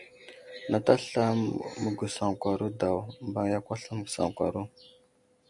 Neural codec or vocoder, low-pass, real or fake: none; 10.8 kHz; real